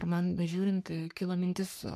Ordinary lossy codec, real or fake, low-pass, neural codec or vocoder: MP3, 96 kbps; fake; 14.4 kHz; codec, 44.1 kHz, 3.4 kbps, Pupu-Codec